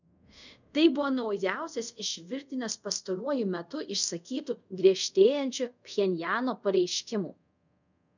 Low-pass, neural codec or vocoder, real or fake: 7.2 kHz; codec, 24 kHz, 0.5 kbps, DualCodec; fake